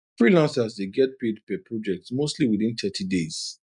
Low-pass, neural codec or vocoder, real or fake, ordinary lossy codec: 10.8 kHz; none; real; none